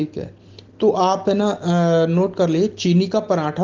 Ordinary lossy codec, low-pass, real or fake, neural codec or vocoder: Opus, 16 kbps; 7.2 kHz; real; none